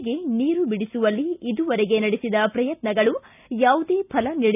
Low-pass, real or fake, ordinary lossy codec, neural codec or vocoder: 3.6 kHz; real; none; none